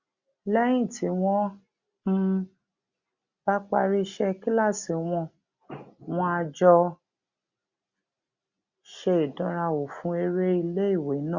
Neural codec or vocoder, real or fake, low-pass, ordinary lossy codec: none; real; 7.2 kHz; Opus, 64 kbps